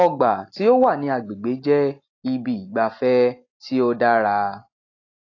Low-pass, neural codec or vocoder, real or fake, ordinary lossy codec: 7.2 kHz; none; real; AAC, 32 kbps